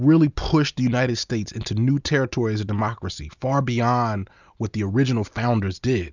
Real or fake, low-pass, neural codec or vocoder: real; 7.2 kHz; none